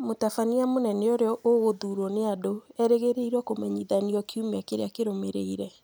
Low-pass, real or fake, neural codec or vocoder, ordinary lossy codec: none; real; none; none